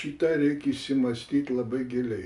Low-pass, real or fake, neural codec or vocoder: 10.8 kHz; real; none